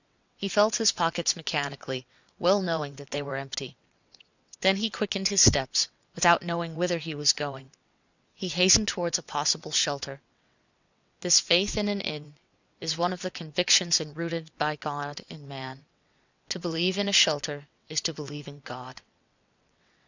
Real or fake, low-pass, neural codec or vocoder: fake; 7.2 kHz; vocoder, 22.05 kHz, 80 mel bands, WaveNeXt